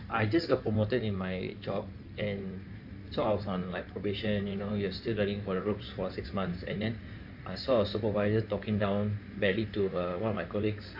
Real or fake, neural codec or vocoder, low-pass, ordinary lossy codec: fake; codec, 16 kHz in and 24 kHz out, 2.2 kbps, FireRedTTS-2 codec; 5.4 kHz; AAC, 48 kbps